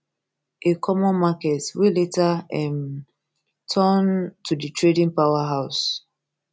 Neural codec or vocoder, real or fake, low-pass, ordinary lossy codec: none; real; none; none